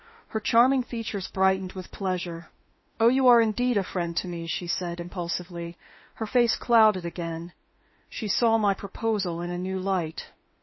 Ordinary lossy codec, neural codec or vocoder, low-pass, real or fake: MP3, 24 kbps; autoencoder, 48 kHz, 32 numbers a frame, DAC-VAE, trained on Japanese speech; 7.2 kHz; fake